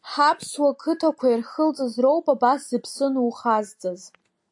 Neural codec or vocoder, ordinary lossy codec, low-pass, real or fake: none; AAC, 64 kbps; 10.8 kHz; real